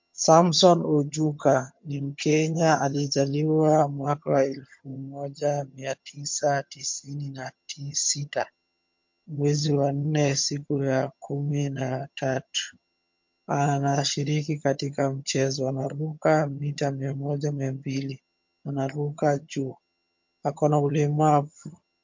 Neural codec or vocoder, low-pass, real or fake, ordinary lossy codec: vocoder, 22.05 kHz, 80 mel bands, HiFi-GAN; 7.2 kHz; fake; MP3, 48 kbps